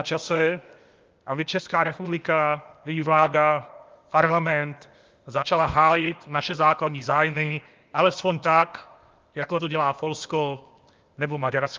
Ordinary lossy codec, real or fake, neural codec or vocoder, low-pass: Opus, 24 kbps; fake; codec, 16 kHz, 0.8 kbps, ZipCodec; 7.2 kHz